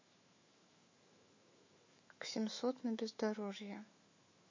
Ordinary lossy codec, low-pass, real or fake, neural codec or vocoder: MP3, 32 kbps; 7.2 kHz; fake; autoencoder, 48 kHz, 128 numbers a frame, DAC-VAE, trained on Japanese speech